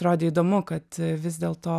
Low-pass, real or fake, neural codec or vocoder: 14.4 kHz; real; none